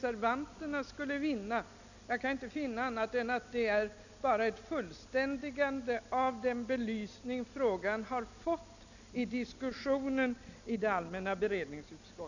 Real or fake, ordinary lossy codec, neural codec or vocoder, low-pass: real; none; none; 7.2 kHz